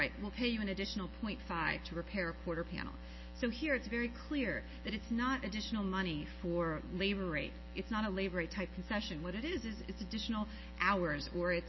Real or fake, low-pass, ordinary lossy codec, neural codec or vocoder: real; 7.2 kHz; MP3, 24 kbps; none